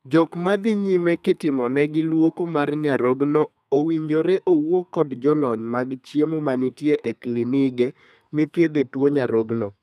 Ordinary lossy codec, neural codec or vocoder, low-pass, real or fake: none; codec, 32 kHz, 1.9 kbps, SNAC; 14.4 kHz; fake